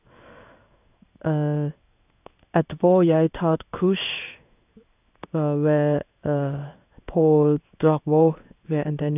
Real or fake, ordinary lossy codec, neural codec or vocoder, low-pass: fake; none; codec, 16 kHz in and 24 kHz out, 1 kbps, XY-Tokenizer; 3.6 kHz